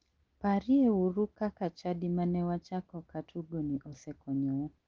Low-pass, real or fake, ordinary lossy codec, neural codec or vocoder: 7.2 kHz; real; Opus, 16 kbps; none